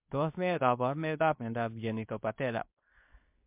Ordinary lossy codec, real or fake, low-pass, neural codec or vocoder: MP3, 32 kbps; fake; 3.6 kHz; codec, 24 kHz, 0.9 kbps, WavTokenizer, medium speech release version 2